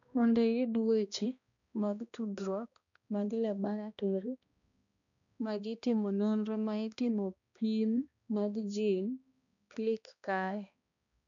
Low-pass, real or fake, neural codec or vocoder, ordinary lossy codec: 7.2 kHz; fake; codec, 16 kHz, 1 kbps, X-Codec, HuBERT features, trained on balanced general audio; none